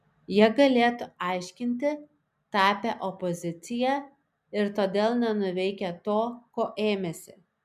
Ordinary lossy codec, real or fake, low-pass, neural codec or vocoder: MP3, 96 kbps; real; 14.4 kHz; none